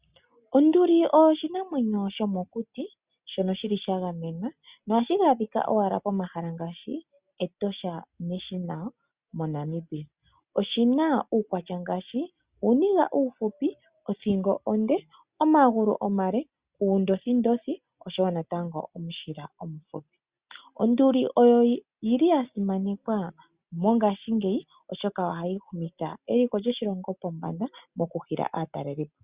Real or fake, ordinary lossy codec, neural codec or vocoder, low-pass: real; Opus, 64 kbps; none; 3.6 kHz